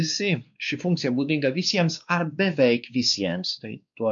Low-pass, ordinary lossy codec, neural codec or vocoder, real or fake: 7.2 kHz; MP3, 96 kbps; codec, 16 kHz, 2 kbps, X-Codec, WavLM features, trained on Multilingual LibriSpeech; fake